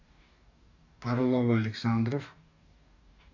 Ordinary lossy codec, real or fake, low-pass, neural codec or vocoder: none; fake; 7.2 kHz; codec, 44.1 kHz, 2.6 kbps, DAC